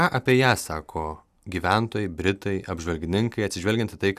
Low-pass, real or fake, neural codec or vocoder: 14.4 kHz; real; none